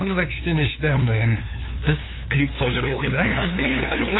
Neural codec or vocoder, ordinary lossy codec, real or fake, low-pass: codec, 16 kHz, 2 kbps, FunCodec, trained on LibriTTS, 25 frames a second; AAC, 16 kbps; fake; 7.2 kHz